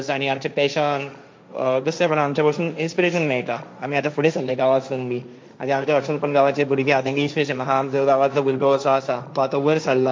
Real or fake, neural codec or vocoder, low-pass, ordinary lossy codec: fake; codec, 16 kHz, 1.1 kbps, Voila-Tokenizer; none; none